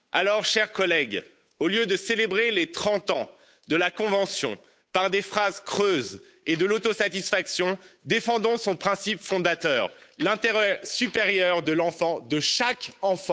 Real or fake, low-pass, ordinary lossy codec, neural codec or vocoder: fake; none; none; codec, 16 kHz, 8 kbps, FunCodec, trained on Chinese and English, 25 frames a second